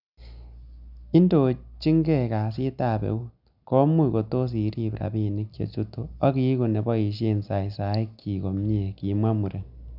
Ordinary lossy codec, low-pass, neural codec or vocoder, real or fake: none; 5.4 kHz; none; real